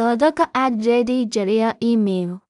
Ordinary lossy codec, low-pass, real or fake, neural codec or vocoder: none; 10.8 kHz; fake; codec, 16 kHz in and 24 kHz out, 0.4 kbps, LongCat-Audio-Codec, two codebook decoder